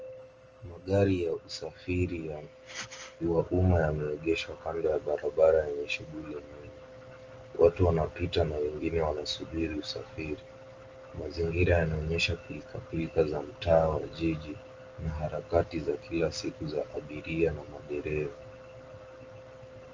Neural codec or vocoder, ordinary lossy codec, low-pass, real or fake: none; Opus, 24 kbps; 7.2 kHz; real